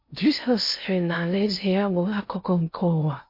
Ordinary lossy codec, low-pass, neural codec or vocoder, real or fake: MP3, 32 kbps; 5.4 kHz; codec, 16 kHz in and 24 kHz out, 0.6 kbps, FocalCodec, streaming, 2048 codes; fake